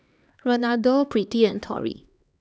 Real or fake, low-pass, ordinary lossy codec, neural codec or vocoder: fake; none; none; codec, 16 kHz, 2 kbps, X-Codec, HuBERT features, trained on LibriSpeech